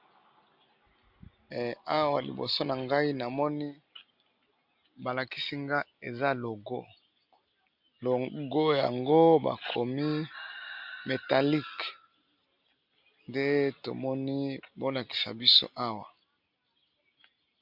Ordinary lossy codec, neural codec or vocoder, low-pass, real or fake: MP3, 48 kbps; none; 5.4 kHz; real